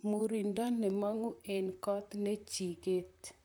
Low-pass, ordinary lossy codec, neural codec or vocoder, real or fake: none; none; vocoder, 44.1 kHz, 128 mel bands, Pupu-Vocoder; fake